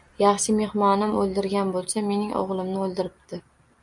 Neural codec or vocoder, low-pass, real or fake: none; 10.8 kHz; real